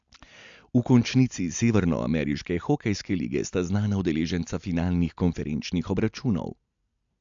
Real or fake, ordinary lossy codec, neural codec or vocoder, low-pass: real; MP3, 64 kbps; none; 7.2 kHz